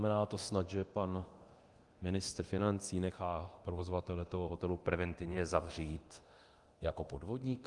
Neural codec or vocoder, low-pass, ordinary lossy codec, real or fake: codec, 24 kHz, 0.9 kbps, DualCodec; 10.8 kHz; Opus, 24 kbps; fake